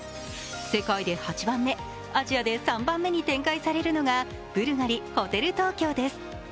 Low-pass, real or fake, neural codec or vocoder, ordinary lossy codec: none; real; none; none